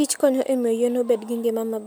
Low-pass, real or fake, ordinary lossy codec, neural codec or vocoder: none; real; none; none